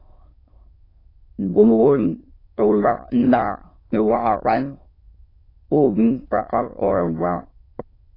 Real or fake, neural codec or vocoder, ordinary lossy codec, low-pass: fake; autoencoder, 22.05 kHz, a latent of 192 numbers a frame, VITS, trained on many speakers; AAC, 24 kbps; 5.4 kHz